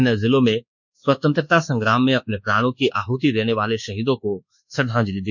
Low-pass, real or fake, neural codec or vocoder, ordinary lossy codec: 7.2 kHz; fake; codec, 24 kHz, 1.2 kbps, DualCodec; none